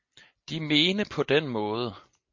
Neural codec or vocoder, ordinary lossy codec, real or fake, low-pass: none; MP3, 48 kbps; real; 7.2 kHz